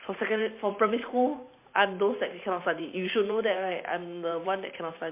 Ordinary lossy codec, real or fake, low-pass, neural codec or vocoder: MP3, 24 kbps; fake; 3.6 kHz; vocoder, 44.1 kHz, 128 mel bands every 512 samples, BigVGAN v2